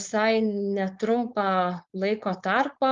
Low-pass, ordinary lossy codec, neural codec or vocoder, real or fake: 7.2 kHz; Opus, 32 kbps; codec, 16 kHz, 4.8 kbps, FACodec; fake